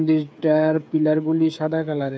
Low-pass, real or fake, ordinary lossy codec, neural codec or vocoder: none; fake; none; codec, 16 kHz, 8 kbps, FreqCodec, smaller model